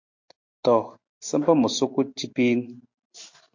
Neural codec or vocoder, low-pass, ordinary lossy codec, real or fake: none; 7.2 kHz; MP3, 48 kbps; real